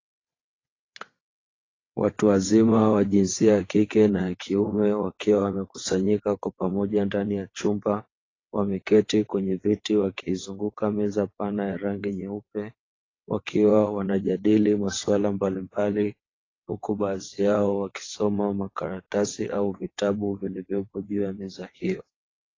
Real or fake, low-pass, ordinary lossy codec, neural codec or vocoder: fake; 7.2 kHz; AAC, 32 kbps; vocoder, 22.05 kHz, 80 mel bands, WaveNeXt